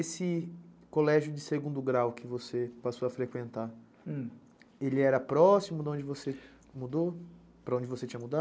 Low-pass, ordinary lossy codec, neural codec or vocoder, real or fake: none; none; none; real